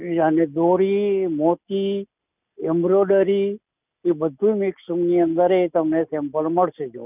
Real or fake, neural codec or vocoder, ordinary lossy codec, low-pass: real; none; none; 3.6 kHz